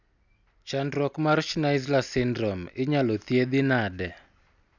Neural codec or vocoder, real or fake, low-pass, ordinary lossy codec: none; real; 7.2 kHz; none